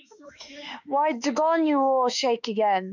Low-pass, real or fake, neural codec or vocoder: 7.2 kHz; fake; autoencoder, 48 kHz, 32 numbers a frame, DAC-VAE, trained on Japanese speech